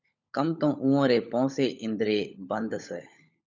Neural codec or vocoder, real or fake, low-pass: codec, 16 kHz, 16 kbps, FunCodec, trained on LibriTTS, 50 frames a second; fake; 7.2 kHz